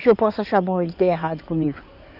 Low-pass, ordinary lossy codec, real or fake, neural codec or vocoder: 5.4 kHz; none; fake; codec, 16 kHz in and 24 kHz out, 2.2 kbps, FireRedTTS-2 codec